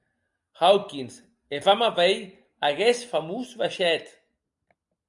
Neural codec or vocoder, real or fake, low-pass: none; real; 10.8 kHz